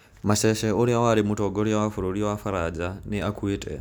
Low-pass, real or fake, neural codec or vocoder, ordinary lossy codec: none; real; none; none